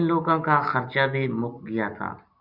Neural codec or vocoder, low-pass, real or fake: none; 5.4 kHz; real